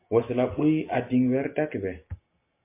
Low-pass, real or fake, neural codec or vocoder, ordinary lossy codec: 3.6 kHz; real; none; MP3, 24 kbps